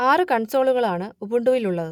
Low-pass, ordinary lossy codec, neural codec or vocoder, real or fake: 19.8 kHz; none; none; real